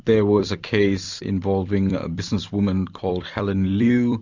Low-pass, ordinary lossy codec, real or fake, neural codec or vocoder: 7.2 kHz; Opus, 64 kbps; fake; vocoder, 44.1 kHz, 128 mel bands every 256 samples, BigVGAN v2